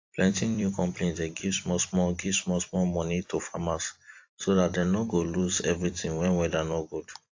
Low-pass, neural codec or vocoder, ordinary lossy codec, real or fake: 7.2 kHz; vocoder, 44.1 kHz, 128 mel bands every 256 samples, BigVGAN v2; AAC, 48 kbps; fake